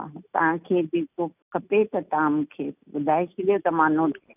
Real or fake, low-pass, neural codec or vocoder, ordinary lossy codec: real; 3.6 kHz; none; none